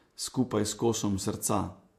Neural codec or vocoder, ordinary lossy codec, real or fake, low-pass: vocoder, 48 kHz, 128 mel bands, Vocos; MP3, 64 kbps; fake; 14.4 kHz